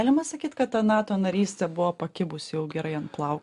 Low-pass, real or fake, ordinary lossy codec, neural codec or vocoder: 10.8 kHz; real; AAC, 96 kbps; none